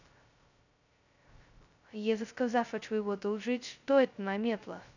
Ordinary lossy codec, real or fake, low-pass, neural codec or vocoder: none; fake; 7.2 kHz; codec, 16 kHz, 0.2 kbps, FocalCodec